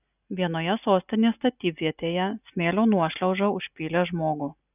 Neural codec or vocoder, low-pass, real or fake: none; 3.6 kHz; real